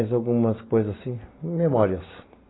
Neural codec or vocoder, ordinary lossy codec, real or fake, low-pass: none; AAC, 16 kbps; real; 7.2 kHz